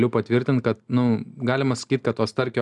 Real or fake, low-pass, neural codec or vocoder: real; 10.8 kHz; none